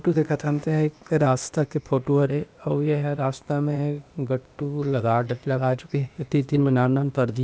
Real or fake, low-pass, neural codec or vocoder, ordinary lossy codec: fake; none; codec, 16 kHz, 0.8 kbps, ZipCodec; none